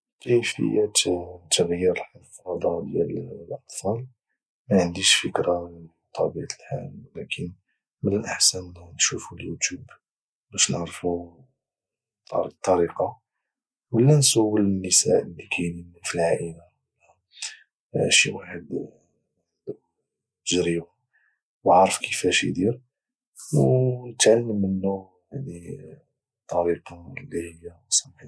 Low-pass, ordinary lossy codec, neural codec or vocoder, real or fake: none; none; none; real